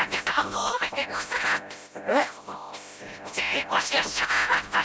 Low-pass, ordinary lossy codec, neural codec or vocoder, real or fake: none; none; codec, 16 kHz, 0.5 kbps, FreqCodec, smaller model; fake